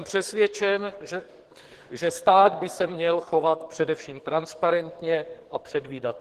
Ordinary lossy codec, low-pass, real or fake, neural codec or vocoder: Opus, 16 kbps; 14.4 kHz; fake; codec, 44.1 kHz, 2.6 kbps, SNAC